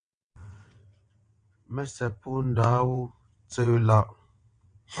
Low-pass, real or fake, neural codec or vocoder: 9.9 kHz; fake; vocoder, 22.05 kHz, 80 mel bands, WaveNeXt